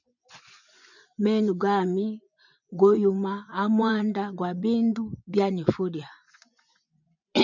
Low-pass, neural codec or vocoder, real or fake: 7.2 kHz; vocoder, 24 kHz, 100 mel bands, Vocos; fake